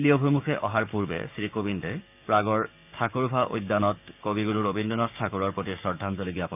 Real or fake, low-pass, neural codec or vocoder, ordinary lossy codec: fake; 3.6 kHz; codec, 16 kHz, 6 kbps, DAC; none